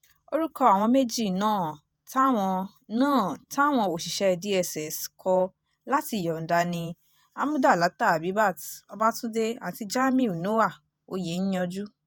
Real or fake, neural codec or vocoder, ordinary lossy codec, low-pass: fake; vocoder, 48 kHz, 128 mel bands, Vocos; none; none